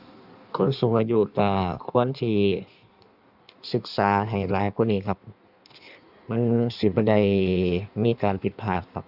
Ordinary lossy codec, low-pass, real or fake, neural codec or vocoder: none; 5.4 kHz; fake; codec, 16 kHz in and 24 kHz out, 1.1 kbps, FireRedTTS-2 codec